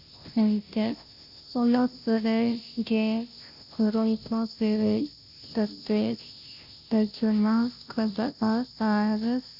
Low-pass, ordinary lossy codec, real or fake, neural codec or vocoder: 5.4 kHz; none; fake; codec, 16 kHz, 0.5 kbps, FunCodec, trained on Chinese and English, 25 frames a second